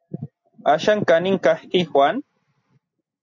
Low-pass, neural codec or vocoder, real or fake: 7.2 kHz; none; real